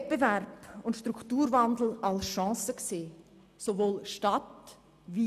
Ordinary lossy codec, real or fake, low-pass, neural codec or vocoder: none; real; 14.4 kHz; none